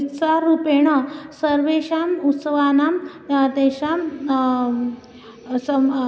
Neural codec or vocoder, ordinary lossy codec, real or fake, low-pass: none; none; real; none